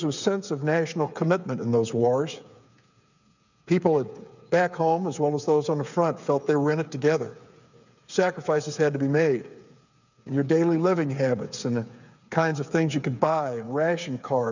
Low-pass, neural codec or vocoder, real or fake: 7.2 kHz; codec, 16 kHz, 8 kbps, FreqCodec, smaller model; fake